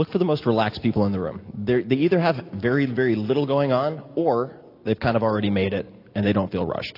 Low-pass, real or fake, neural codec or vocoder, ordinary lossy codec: 5.4 kHz; real; none; AAC, 32 kbps